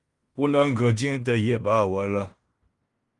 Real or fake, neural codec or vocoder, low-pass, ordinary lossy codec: fake; codec, 16 kHz in and 24 kHz out, 0.9 kbps, LongCat-Audio-Codec, fine tuned four codebook decoder; 10.8 kHz; Opus, 32 kbps